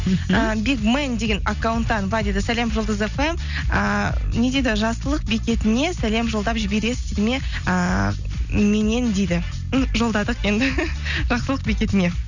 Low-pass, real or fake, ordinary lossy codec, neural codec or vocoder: 7.2 kHz; real; none; none